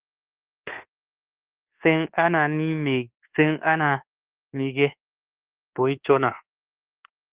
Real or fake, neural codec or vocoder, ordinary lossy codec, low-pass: fake; codec, 24 kHz, 1.2 kbps, DualCodec; Opus, 16 kbps; 3.6 kHz